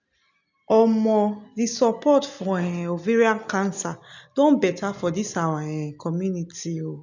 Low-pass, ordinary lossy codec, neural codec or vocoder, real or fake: 7.2 kHz; none; none; real